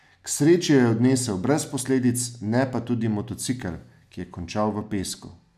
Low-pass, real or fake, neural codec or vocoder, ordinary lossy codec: 14.4 kHz; real; none; none